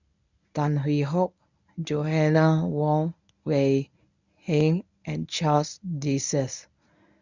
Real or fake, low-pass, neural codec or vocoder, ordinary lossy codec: fake; 7.2 kHz; codec, 24 kHz, 0.9 kbps, WavTokenizer, medium speech release version 1; none